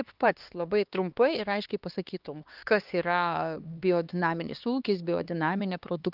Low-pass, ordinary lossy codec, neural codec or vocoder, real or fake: 5.4 kHz; Opus, 32 kbps; codec, 16 kHz, 2 kbps, X-Codec, HuBERT features, trained on LibriSpeech; fake